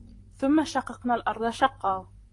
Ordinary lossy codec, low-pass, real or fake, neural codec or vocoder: AAC, 48 kbps; 10.8 kHz; fake; vocoder, 44.1 kHz, 128 mel bands, Pupu-Vocoder